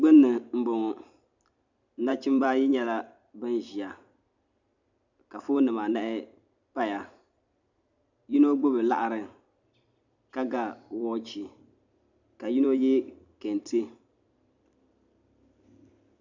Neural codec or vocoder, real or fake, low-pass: vocoder, 44.1 kHz, 128 mel bands every 256 samples, BigVGAN v2; fake; 7.2 kHz